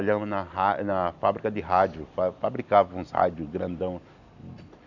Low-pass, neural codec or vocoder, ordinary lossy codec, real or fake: 7.2 kHz; none; none; real